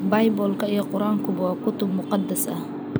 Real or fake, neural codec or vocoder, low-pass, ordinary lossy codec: real; none; none; none